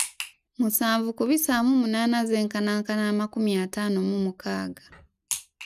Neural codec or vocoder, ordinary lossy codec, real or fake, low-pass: none; none; real; 14.4 kHz